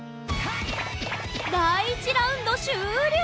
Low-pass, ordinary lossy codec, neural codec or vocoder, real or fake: none; none; none; real